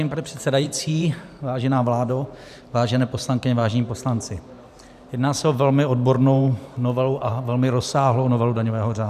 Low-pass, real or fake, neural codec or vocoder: 14.4 kHz; fake; vocoder, 44.1 kHz, 128 mel bands every 512 samples, BigVGAN v2